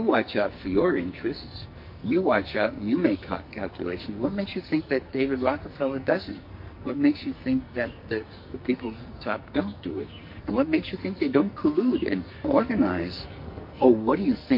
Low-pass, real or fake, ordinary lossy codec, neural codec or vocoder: 5.4 kHz; fake; MP3, 32 kbps; codec, 44.1 kHz, 2.6 kbps, SNAC